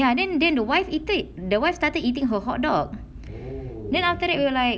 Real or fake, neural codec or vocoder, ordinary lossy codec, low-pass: real; none; none; none